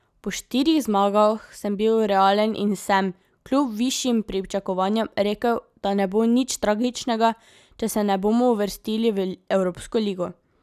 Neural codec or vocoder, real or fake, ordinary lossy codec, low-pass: none; real; none; 14.4 kHz